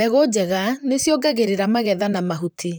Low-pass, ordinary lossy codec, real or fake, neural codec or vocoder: none; none; fake; vocoder, 44.1 kHz, 128 mel bands, Pupu-Vocoder